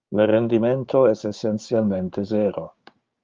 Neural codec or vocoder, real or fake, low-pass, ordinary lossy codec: codec, 16 kHz, 6 kbps, DAC; fake; 7.2 kHz; Opus, 32 kbps